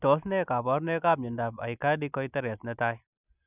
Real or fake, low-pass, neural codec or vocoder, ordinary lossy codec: real; 3.6 kHz; none; none